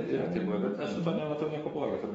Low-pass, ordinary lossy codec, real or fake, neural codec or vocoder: 19.8 kHz; AAC, 24 kbps; fake; codec, 44.1 kHz, 7.8 kbps, DAC